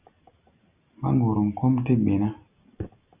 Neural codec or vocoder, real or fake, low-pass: none; real; 3.6 kHz